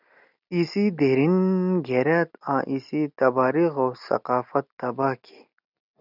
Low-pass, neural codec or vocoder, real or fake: 5.4 kHz; none; real